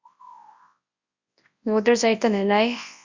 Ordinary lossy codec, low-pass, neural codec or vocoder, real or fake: Opus, 64 kbps; 7.2 kHz; codec, 24 kHz, 0.9 kbps, WavTokenizer, large speech release; fake